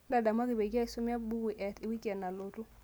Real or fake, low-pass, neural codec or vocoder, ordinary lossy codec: real; none; none; none